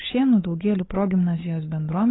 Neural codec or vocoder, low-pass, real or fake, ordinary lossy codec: codec, 16 kHz, 8 kbps, FunCodec, trained on Chinese and English, 25 frames a second; 7.2 kHz; fake; AAC, 16 kbps